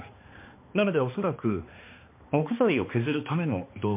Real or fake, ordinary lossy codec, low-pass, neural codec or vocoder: fake; MP3, 24 kbps; 3.6 kHz; codec, 16 kHz, 2 kbps, X-Codec, HuBERT features, trained on balanced general audio